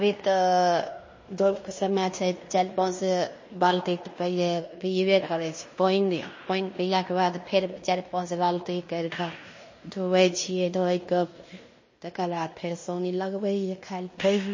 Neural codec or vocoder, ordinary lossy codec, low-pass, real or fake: codec, 16 kHz in and 24 kHz out, 0.9 kbps, LongCat-Audio-Codec, fine tuned four codebook decoder; MP3, 32 kbps; 7.2 kHz; fake